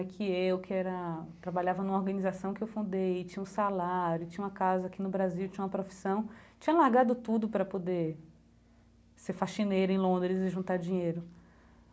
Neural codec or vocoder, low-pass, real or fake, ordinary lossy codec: none; none; real; none